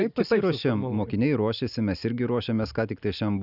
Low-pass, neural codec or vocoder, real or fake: 5.4 kHz; none; real